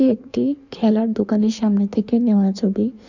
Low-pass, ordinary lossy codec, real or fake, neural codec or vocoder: 7.2 kHz; none; fake; codec, 16 kHz in and 24 kHz out, 1.1 kbps, FireRedTTS-2 codec